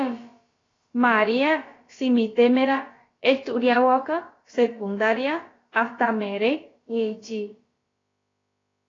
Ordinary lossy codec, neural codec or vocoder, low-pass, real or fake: AAC, 32 kbps; codec, 16 kHz, about 1 kbps, DyCAST, with the encoder's durations; 7.2 kHz; fake